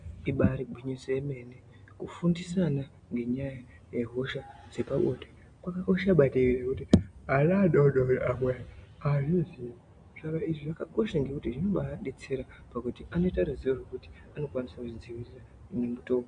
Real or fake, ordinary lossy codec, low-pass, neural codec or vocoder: real; AAC, 64 kbps; 9.9 kHz; none